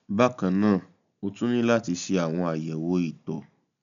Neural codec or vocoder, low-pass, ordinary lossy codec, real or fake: none; 7.2 kHz; none; real